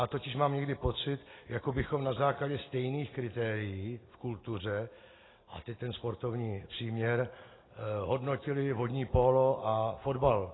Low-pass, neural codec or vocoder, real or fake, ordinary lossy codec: 7.2 kHz; none; real; AAC, 16 kbps